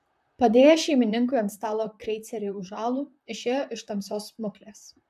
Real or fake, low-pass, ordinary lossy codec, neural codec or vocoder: fake; 14.4 kHz; AAC, 96 kbps; vocoder, 44.1 kHz, 128 mel bands, Pupu-Vocoder